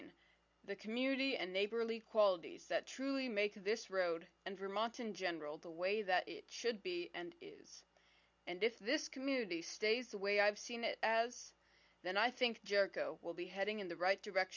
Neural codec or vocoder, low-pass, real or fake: none; 7.2 kHz; real